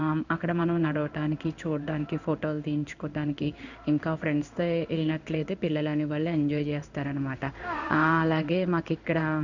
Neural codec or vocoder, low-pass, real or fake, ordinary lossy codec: codec, 16 kHz in and 24 kHz out, 1 kbps, XY-Tokenizer; 7.2 kHz; fake; none